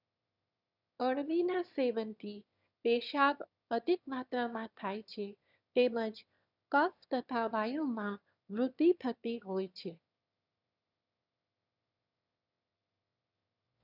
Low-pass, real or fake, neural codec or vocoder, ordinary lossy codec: 5.4 kHz; fake; autoencoder, 22.05 kHz, a latent of 192 numbers a frame, VITS, trained on one speaker; none